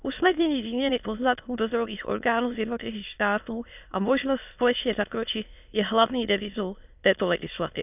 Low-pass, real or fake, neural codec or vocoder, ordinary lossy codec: 3.6 kHz; fake; autoencoder, 22.05 kHz, a latent of 192 numbers a frame, VITS, trained on many speakers; none